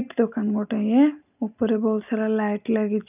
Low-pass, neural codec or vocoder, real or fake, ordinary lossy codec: 3.6 kHz; none; real; none